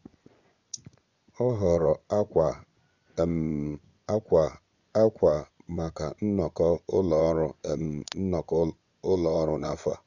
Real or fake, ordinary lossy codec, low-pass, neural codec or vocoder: fake; none; 7.2 kHz; vocoder, 24 kHz, 100 mel bands, Vocos